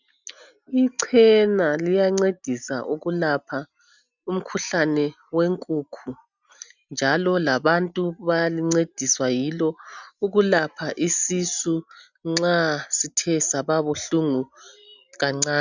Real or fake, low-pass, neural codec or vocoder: real; 7.2 kHz; none